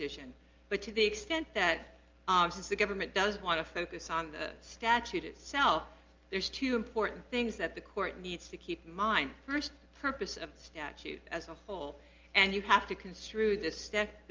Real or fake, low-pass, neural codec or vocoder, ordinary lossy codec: real; 7.2 kHz; none; Opus, 24 kbps